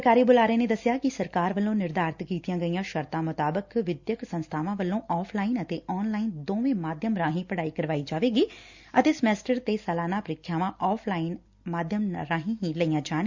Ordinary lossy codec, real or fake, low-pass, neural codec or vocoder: Opus, 64 kbps; real; 7.2 kHz; none